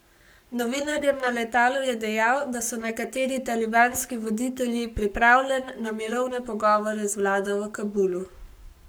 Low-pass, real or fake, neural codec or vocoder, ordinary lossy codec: none; fake; codec, 44.1 kHz, 7.8 kbps, Pupu-Codec; none